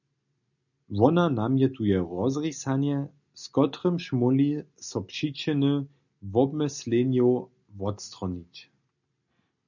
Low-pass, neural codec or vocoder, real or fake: 7.2 kHz; none; real